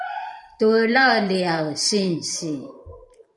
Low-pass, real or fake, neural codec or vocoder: 10.8 kHz; fake; vocoder, 44.1 kHz, 128 mel bands every 512 samples, BigVGAN v2